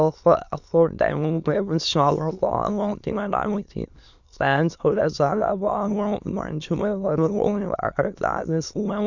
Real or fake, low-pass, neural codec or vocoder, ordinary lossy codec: fake; 7.2 kHz; autoencoder, 22.05 kHz, a latent of 192 numbers a frame, VITS, trained on many speakers; none